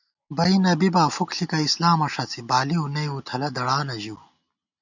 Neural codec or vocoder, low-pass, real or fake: none; 7.2 kHz; real